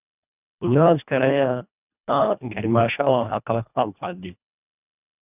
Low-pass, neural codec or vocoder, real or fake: 3.6 kHz; codec, 24 kHz, 1.5 kbps, HILCodec; fake